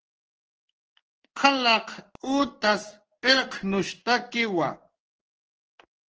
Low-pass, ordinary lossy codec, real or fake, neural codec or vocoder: 7.2 kHz; Opus, 16 kbps; fake; codec, 16 kHz in and 24 kHz out, 1 kbps, XY-Tokenizer